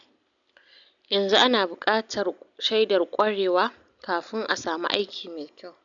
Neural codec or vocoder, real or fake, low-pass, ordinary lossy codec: none; real; 7.2 kHz; none